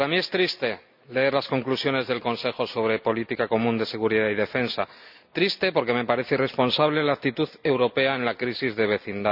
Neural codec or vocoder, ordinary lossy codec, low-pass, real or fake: none; none; 5.4 kHz; real